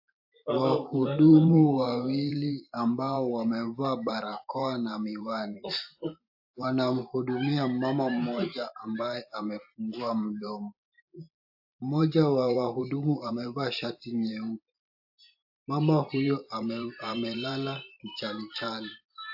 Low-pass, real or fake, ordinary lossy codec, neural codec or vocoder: 5.4 kHz; fake; AAC, 48 kbps; vocoder, 44.1 kHz, 128 mel bands every 512 samples, BigVGAN v2